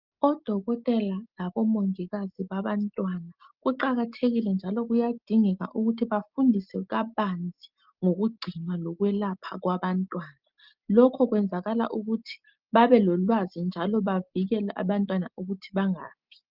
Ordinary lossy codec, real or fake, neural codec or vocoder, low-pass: Opus, 32 kbps; real; none; 5.4 kHz